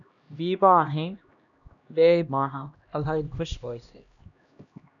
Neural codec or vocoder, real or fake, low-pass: codec, 16 kHz, 1 kbps, X-Codec, HuBERT features, trained on LibriSpeech; fake; 7.2 kHz